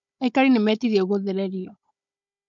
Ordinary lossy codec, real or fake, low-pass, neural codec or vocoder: MP3, 64 kbps; fake; 7.2 kHz; codec, 16 kHz, 16 kbps, FunCodec, trained on Chinese and English, 50 frames a second